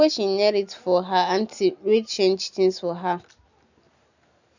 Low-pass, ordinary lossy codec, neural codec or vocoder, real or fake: 7.2 kHz; none; none; real